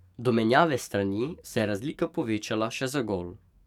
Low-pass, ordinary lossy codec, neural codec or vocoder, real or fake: 19.8 kHz; none; codec, 44.1 kHz, 7.8 kbps, DAC; fake